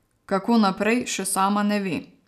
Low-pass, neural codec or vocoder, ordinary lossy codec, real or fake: 14.4 kHz; none; none; real